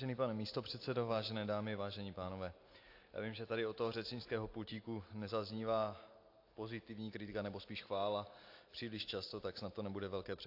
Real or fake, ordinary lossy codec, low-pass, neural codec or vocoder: real; AAC, 32 kbps; 5.4 kHz; none